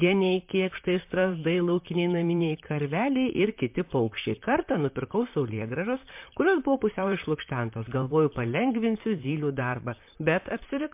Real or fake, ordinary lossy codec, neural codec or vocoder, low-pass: fake; MP3, 32 kbps; vocoder, 44.1 kHz, 128 mel bands, Pupu-Vocoder; 3.6 kHz